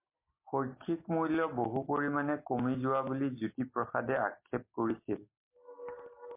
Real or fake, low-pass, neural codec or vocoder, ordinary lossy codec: real; 3.6 kHz; none; MP3, 24 kbps